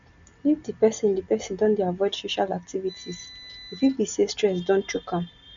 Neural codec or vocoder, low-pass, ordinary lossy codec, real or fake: none; 7.2 kHz; MP3, 64 kbps; real